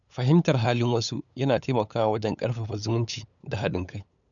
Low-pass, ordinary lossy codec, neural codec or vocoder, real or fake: 7.2 kHz; none; codec, 16 kHz, 8 kbps, FunCodec, trained on LibriTTS, 25 frames a second; fake